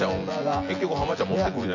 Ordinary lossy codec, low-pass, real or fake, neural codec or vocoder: AAC, 48 kbps; 7.2 kHz; fake; vocoder, 24 kHz, 100 mel bands, Vocos